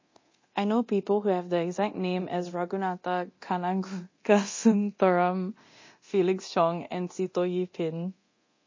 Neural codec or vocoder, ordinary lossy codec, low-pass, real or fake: codec, 24 kHz, 0.9 kbps, DualCodec; MP3, 32 kbps; 7.2 kHz; fake